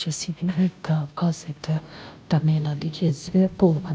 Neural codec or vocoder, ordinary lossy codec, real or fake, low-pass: codec, 16 kHz, 0.5 kbps, FunCodec, trained on Chinese and English, 25 frames a second; none; fake; none